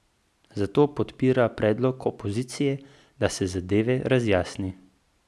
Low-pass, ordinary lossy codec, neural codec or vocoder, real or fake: none; none; none; real